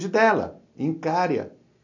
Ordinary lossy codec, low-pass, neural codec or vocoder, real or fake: MP3, 48 kbps; 7.2 kHz; none; real